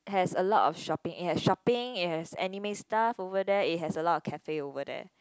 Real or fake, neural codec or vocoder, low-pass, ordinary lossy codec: real; none; none; none